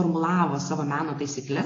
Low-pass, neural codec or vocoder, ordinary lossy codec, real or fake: 7.2 kHz; none; AAC, 32 kbps; real